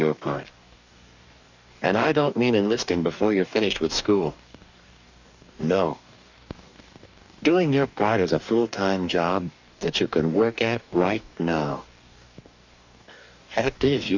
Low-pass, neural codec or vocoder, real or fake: 7.2 kHz; codec, 44.1 kHz, 2.6 kbps, DAC; fake